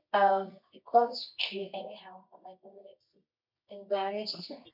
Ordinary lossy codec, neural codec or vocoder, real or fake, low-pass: MP3, 48 kbps; codec, 24 kHz, 0.9 kbps, WavTokenizer, medium music audio release; fake; 5.4 kHz